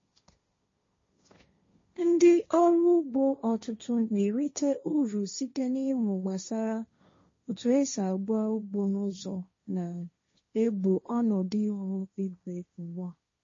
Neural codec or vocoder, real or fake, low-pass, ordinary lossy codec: codec, 16 kHz, 1.1 kbps, Voila-Tokenizer; fake; 7.2 kHz; MP3, 32 kbps